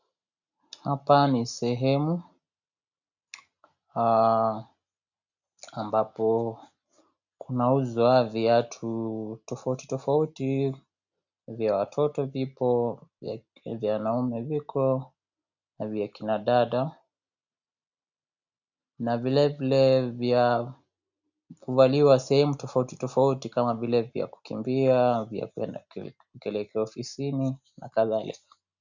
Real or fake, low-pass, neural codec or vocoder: real; 7.2 kHz; none